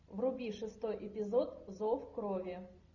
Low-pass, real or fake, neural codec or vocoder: 7.2 kHz; real; none